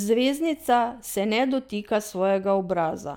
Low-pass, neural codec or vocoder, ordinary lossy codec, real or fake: none; none; none; real